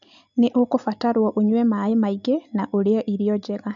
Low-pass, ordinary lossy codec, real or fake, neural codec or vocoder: 7.2 kHz; none; real; none